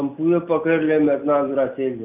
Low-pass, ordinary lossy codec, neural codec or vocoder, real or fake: 3.6 kHz; none; none; real